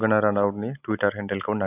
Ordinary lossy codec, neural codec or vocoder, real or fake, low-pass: none; none; real; 3.6 kHz